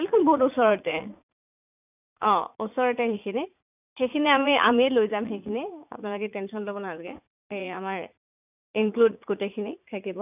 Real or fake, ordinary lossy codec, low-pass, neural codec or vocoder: fake; none; 3.6 kHz; vocoder, 44.1 kHz, 80 mel bands, Vocos